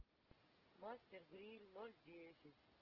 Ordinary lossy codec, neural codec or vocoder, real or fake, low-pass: Opus, 16 kbps; none; real; 5.4 kHz